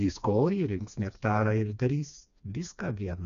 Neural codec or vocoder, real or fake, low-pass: codec, 16 kHz, 2 kbps, FreqCodec, smaller model; fake; 7.2 kHz